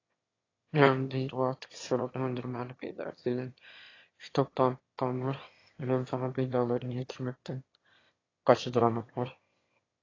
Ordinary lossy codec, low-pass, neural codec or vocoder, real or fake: AAC, 32 kbps; 7.2 kHz; autoencoder, 22.05 kHz, a latent of 192 numbers a frame, VITS, trained on one speaker; fake